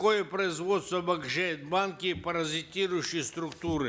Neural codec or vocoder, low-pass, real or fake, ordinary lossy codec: none; none; real; none